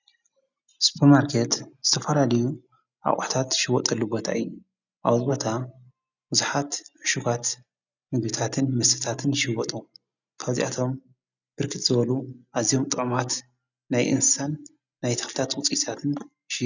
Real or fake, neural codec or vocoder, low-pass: real; none; 7.2 kHz